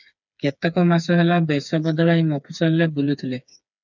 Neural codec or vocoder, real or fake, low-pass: codec, 16 kHz, 4 kbps, FreqCodec, smaller model; fake; 7.2 kHz